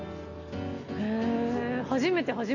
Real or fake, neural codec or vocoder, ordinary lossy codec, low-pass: real; none; none; 7.2 kHz